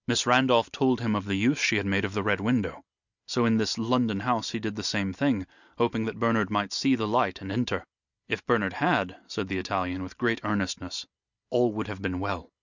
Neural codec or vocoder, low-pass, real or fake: none; 7.2 kHz; real